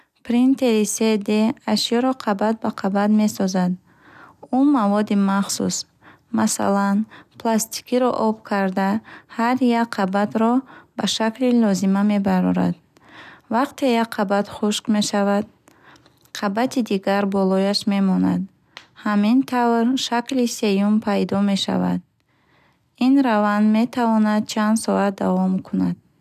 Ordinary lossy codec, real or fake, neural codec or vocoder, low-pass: none; real; none; 14.4 kHz